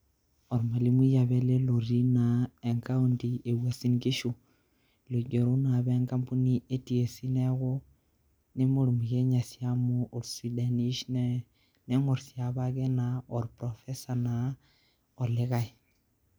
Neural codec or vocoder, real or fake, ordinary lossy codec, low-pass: none; real; none; none